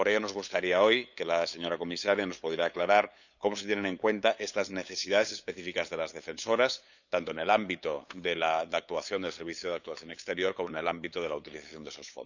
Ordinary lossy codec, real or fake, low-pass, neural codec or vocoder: none; fake; 7.2 kHz; codec, 16 kHz, 8 kbps, FunCodec, trained on LibriTTS, 25 frames a second